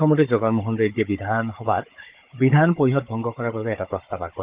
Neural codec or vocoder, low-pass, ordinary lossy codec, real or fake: codec, 16 kHz, 16 kbps, FunCodec, trained on Chinese and English, 50 frames a second; 3.6 kHz; Opus, 32 kbps; fake